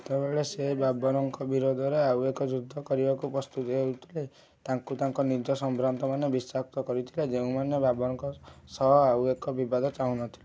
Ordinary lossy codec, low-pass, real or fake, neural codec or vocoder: none; none; real; none